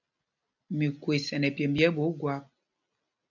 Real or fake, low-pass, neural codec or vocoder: real; 7.2 kHz; none